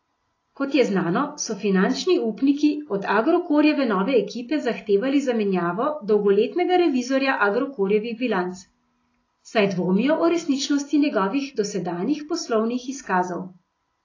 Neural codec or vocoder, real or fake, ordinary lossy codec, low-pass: none; real; AAC, 32 kbps; 7.2 kHz